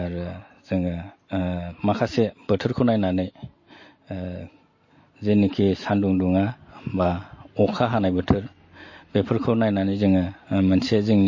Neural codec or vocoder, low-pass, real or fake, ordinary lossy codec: none; 7.2 kHz; real; MP3, 32 kbps